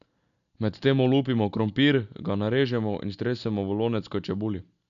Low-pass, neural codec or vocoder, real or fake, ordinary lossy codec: 7.2 kHz; none; real; none